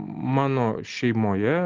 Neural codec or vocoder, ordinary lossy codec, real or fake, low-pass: none; Opus, 32 kbps; real; 7.2 kHz